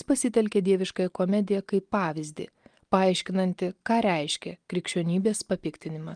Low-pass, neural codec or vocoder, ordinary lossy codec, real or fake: 9.9 kHz; none; Opus, 32 kbps; real